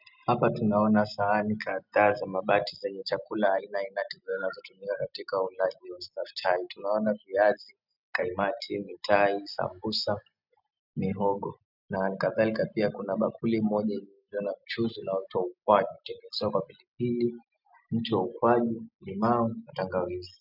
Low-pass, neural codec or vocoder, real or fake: 5.4 kHz; none; real